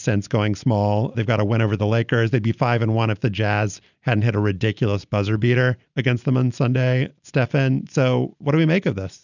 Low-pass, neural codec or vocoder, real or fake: 7.2 kHz; none; real